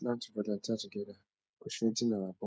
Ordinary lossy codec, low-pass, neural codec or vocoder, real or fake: none; none; codec, 16 kHz, 8 kbps, FreqCodec, smaller model; fake